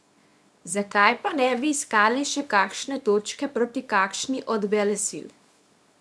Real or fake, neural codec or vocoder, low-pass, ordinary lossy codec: fake; codec, 24 kHz, 0.9 kbps, WavTokenizer, small release; none; none